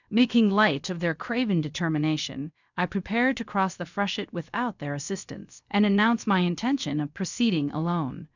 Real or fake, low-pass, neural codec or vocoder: fake; 7.2 kHz; codec, 16 kHz, about 1 kbps, DyCAST, with the encoder's durations